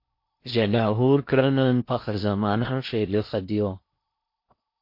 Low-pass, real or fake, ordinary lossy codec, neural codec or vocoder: 5.4 kHz; fake; MP3, 32 kbps; codec, 16 kHz in and 24 kHz out, 0.6 kbps, FocalCodec, streaming, 4096 codes